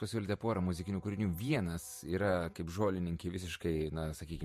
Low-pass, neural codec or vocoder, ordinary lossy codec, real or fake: 14.4 kHz; vocoder, 48 kHz, 128 mel bands, Vocos; MP3, 64 kbps; fake